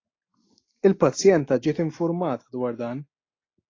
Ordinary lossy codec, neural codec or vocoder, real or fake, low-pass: AAC, 32 kbps; none; real; 7.2 kHz